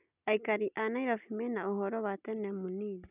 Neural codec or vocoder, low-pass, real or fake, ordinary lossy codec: none; 3.6 kHz; real; none